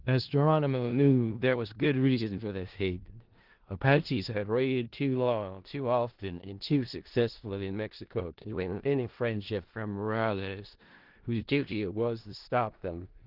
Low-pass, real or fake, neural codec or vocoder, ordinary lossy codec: 5.4 kHz; fake; codec, 16 kHz in and 24 kHz out, 0.4 kbps, LongCat-Audio-Codec, four codebook decoder; Opus, 16 kbps